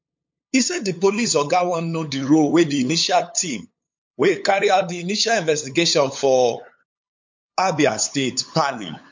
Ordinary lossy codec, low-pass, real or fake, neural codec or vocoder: MP3, 48 kbps; 7.2 kHz; fake; codec, 16 kHz, 8 kbps, FunCodec, trained on LibriTTS, 25 frames a second